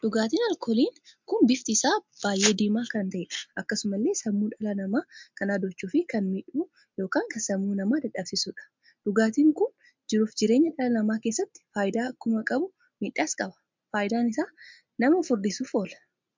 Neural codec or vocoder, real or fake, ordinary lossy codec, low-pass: none; real; MP3, 64 kbps; 7.2 kHz